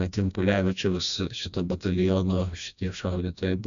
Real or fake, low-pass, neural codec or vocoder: fake; 7.2 kHz; codec, 16 kHz, 1 kbps, FreqCodec, smaller model